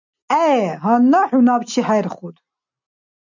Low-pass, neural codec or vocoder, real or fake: 7.2 kHz; none; real